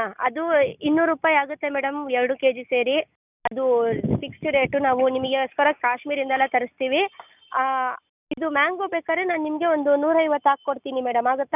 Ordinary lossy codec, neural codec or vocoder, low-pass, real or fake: none; none; 3.6 kHz; real